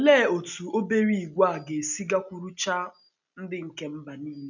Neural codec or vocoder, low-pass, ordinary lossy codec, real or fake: none; 7.2 kHz; none; real